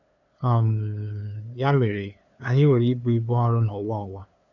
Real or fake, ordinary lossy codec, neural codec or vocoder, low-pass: fake; none; codec, 16 kHz, 2 kbps, FunCodec, trained on LibriTTS, 25 frames a second; 7.2 kHz